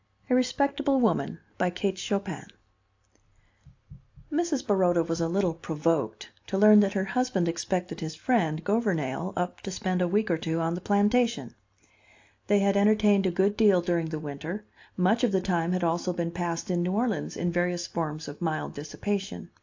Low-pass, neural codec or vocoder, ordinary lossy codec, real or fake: 7.2 kHz; none; AAC, 48 kbps; real